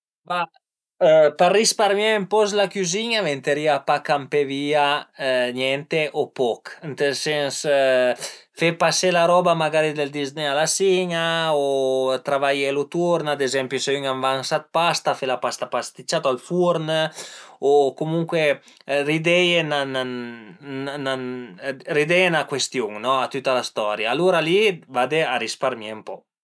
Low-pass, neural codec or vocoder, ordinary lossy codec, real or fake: none; none; none; real